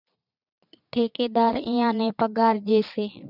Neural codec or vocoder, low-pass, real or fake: vocoder, 22.05 kHz, 80 mel bands, Vocos; 5.4 kHz; fake